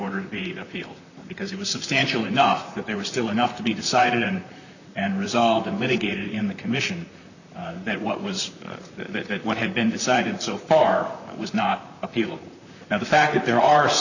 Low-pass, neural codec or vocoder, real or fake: 7.2 kHz; vocoder, 44.1 kHz, 128 mel bands, Pupu-Vocoder; fake